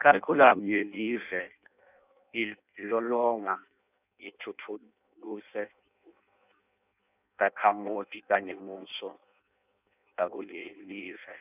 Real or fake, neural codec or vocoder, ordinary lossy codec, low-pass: fake; codec, 16 kHz in and 24 kHz out, 0.6 kbps, FireRedTTS-2 codec; none; 3.6 kHz